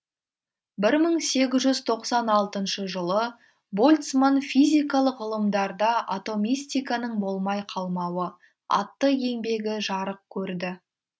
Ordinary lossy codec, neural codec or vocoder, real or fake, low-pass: none; none; real; none